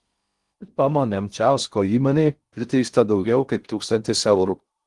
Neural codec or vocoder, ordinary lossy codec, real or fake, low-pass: codec, 16 kHz in and 24 kHz out, 0.6 kbps, FocalCodec, streaming, 4096 codes; Opus, 24 kbps; fake; 10.8 kHz